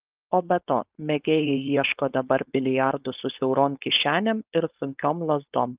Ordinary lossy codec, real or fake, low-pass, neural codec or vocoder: Opus, 32 kbps; fake; 3.6 kHz; codec, 16 kHz, 4.8 kbps, FACodec